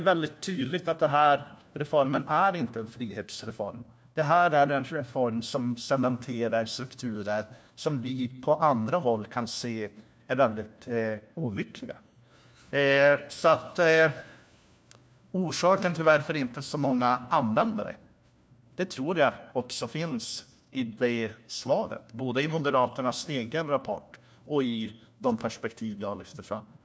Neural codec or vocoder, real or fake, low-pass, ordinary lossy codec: codec, 16 kHz, 1 kbps, FunCodec, trained on LibriTTS, 50 frames a second; fake; none; none